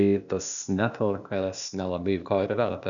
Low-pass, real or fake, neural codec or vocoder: 7.2 kHz; fake; codec, 16 kHz, 0.8 kbps, ZipCodec